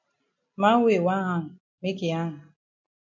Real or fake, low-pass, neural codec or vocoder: real; 7.2 kHz; none